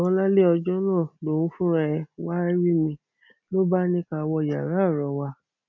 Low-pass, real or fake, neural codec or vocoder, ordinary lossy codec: 7.2 kHz; real; none; none